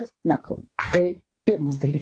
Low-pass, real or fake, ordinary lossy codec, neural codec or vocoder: 9.9 kHz; fake; AAC, 48 kbps; codec, 24 kHz, 1 kbps, SNAC